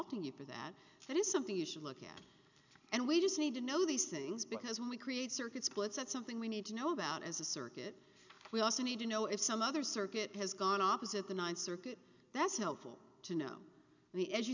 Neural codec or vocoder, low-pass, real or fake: none; 7.2 kHz; real